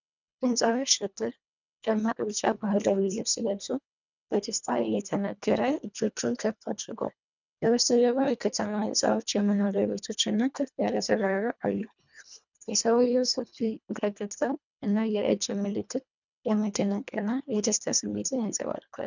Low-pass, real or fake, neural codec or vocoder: 7.2 kHz; fake; codec, 24 kHz, 1.5 kbps, HILCodec